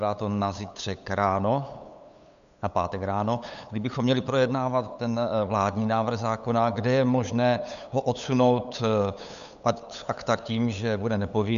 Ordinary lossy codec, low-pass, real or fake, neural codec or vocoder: MP3, 96 kbps; 7.2 kHz; fake; codec, 16 kHz, 8 kbps, FunCodec, trained on LibriTTS, 25 frames a second